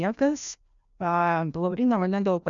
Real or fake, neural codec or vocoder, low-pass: fake; codec, 16 kHz, 1 kbps, FreqCodec, larger model; 7.2 kHz